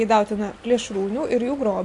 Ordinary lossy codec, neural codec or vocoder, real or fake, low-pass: AAC, 64 kbps; none; real; 10.8 kHz